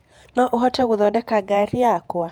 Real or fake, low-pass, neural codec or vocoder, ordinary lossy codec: fake; 19.8 kHz; vocoder, 48 kHz, 128 mel bands, Vocos; none